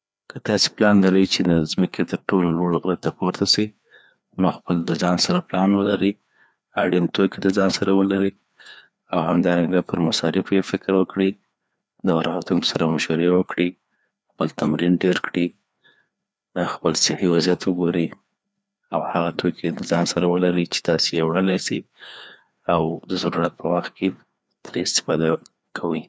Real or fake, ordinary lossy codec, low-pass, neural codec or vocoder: fake; none; none; codec, 16 kHz, 2 kbps, FreqCodec, larger model